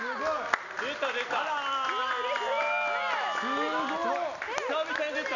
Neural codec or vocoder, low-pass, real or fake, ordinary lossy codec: none; 7.2 kHz; real; none